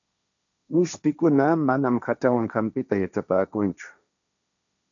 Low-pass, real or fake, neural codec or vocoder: 7.2 kHz; fake; codec, 16 kHz, 1.1 kbps, Voila-Tokenizer